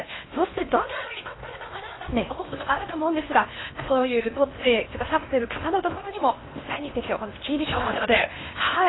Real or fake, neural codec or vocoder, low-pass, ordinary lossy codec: fake; codec, 16 kHz in and 24 kHz out, 0.8 kbps, FocalCodec, streaming, 65536 codes; 7.2 kHz; AAC, 16 kbps